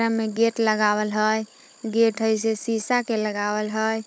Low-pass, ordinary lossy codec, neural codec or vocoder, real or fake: none; none; codec, 16 kHz, 16 kbps, FunCodec, trained on Chinese and English, 50 frames a second; fake